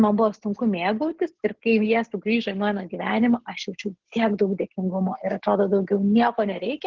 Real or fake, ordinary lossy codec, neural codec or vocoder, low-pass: real; Opus, 16 kbps; none; 7.2 kHz